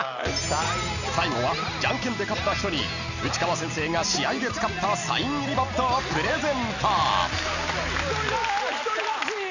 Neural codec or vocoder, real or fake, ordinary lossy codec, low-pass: none; real; none; 7.2 kHz